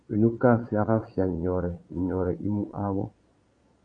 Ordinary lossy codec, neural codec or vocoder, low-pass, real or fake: MP3, 48 kbps; vocoder, 22.05 kHz, 80 mel bands, Vocos; 9.9 kHz; fake